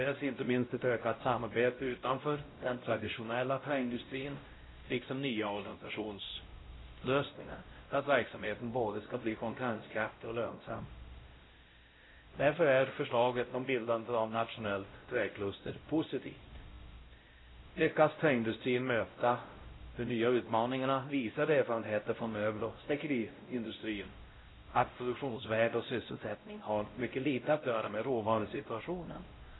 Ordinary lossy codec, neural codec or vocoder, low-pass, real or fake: AAC, 16 kbps; codec, 16 kHz, 0.5 kbps, X-Codec, WavLM features, trained on Multilingual LibriSpeech; 7.2 kHz; fake